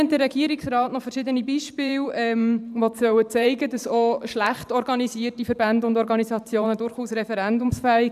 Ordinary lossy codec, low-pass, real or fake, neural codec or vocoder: none; 14.4 kHz; fake; vocoder, 44.1 kHz, 128 mel bands every 512 samples, BigVGAN v2